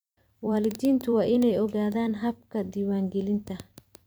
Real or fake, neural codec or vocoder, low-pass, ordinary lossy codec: fake; vocoder, 44.1 kHz, 128 mel bands every 256 samples, BigVGAN v2; none; none